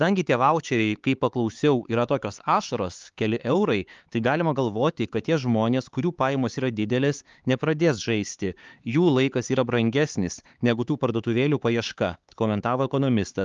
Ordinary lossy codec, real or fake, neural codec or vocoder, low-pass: Opus, 32 kbps; fake; codec, 16 kHz, 4 kbps, X-Codec, HuBERT features, trained on LibriSpeech; 7.2 kHz